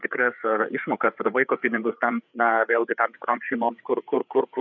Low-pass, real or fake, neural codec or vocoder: 7.2 kHz; fake; codec, 16 kHz, 4 kbps, FreqCodec, larger model